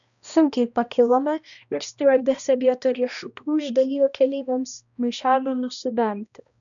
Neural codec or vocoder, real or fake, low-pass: codec, 16 kHz, 1 kbps, X-Codec, HuBERT features, trained on balanced general audio; fake; 7.2 kHz